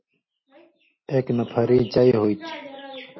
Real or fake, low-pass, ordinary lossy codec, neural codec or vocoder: real; 7.2 kHz; MP3, 24 kbps; none